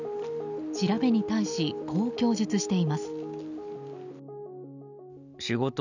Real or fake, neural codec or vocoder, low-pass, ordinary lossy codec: real; none; 7.2 kHz; none